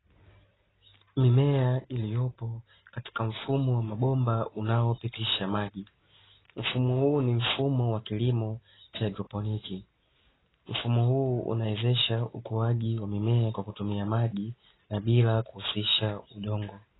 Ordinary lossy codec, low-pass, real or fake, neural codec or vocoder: AAC, 16 kbps; 7.2 kHz; real; none